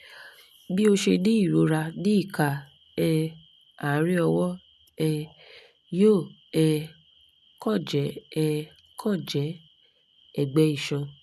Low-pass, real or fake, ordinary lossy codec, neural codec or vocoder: 14.4 kHz; real; none; none